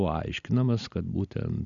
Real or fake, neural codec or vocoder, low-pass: real; none; 7.2 kHz